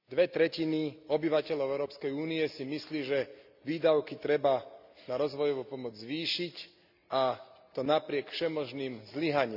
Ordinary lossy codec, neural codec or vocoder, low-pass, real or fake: none; none; 5.4 kHz; real